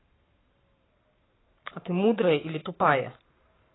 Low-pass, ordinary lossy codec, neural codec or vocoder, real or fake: 7.2 kHz; AAC, 16 kbps; codec, 44.1 kHz, 7.8 kbps, Pupu-Codec; fake